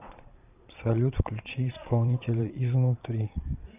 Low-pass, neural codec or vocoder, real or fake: 3.6 kHz; vocoder, 22.05 kHz, 80 mel bands, Vocos; fake